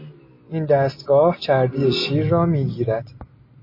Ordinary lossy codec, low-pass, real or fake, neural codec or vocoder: MP3, 24 kbps; 5.4 kHz; real; none